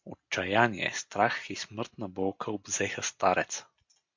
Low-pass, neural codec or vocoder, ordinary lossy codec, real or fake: 7.2 kHz; none; MP3, 48 kbps; real